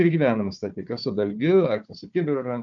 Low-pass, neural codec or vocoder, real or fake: 7.2 kHz; codec, 16 kHz, 4 kbps, FunCodec, trained on Chinese and English, 50 frames a second; fake